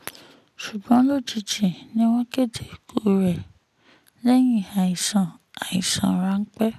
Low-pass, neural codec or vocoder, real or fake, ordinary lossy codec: 14.4 kHz; none; real; none